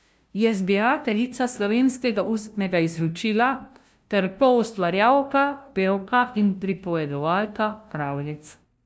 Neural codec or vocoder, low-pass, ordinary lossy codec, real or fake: codec, 16 kHz, 0.5 kbps, FunCodec, trained on LibriTTS, 25 frames a second; none; none; fake